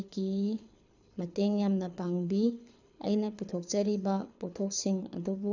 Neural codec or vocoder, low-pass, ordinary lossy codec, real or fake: codec, 24 kHz, 6 kbps, HILCodec; 7.2 kHz; none; fake